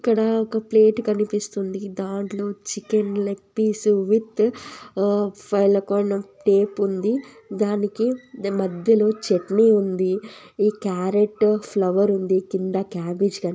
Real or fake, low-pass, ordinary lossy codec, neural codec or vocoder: real; none; none; none